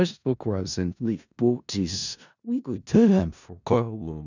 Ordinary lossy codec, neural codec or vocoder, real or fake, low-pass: none; codec, 16 kHz in and 24 kHz out, 0.4 kbps, LongCat-Audio-Codec, four codebook decoder; fake; 7.2 kHz